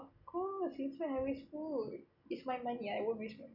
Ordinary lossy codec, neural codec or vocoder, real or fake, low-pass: none; none; real; 5.4 kHz